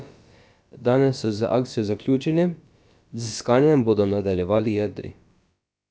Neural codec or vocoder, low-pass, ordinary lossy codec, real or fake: codec, 16 kHz, about 1 kbps, DyCAST, with the encoder's durations; none; none; fake